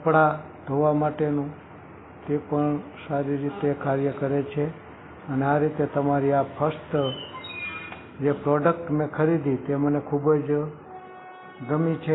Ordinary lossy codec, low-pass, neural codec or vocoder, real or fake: AAC, 16 kbps; 7.2 kHz; none; real